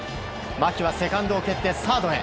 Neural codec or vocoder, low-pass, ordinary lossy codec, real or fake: none; none; none; real